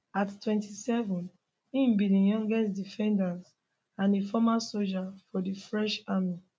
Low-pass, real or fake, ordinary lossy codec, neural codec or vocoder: none; real; none; none